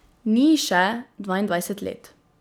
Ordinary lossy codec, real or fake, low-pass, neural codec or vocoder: none; real; none; none